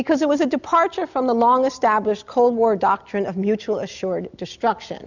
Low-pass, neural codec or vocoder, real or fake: 7.2 kHz; none; real